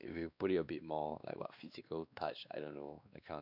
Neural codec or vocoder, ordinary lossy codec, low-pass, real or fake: codec, 16 kHz, 2 kbps, X-Codec, WavLM features, trained on Multilingual LibriSpeech; none; 5.4 kHz; fake